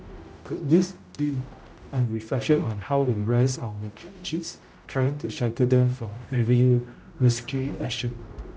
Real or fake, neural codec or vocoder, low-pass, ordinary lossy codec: fake; codec, 16 kHz, 0.5 kbps, X-Codec, HuBERT features, trained on general audio; none; none